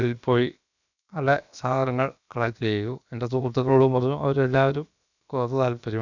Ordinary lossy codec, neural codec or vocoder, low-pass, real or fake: none; codec, 16 kHz, about 1 kbps, DyCAST, with the encoder's durations; 7.2 kHz; fake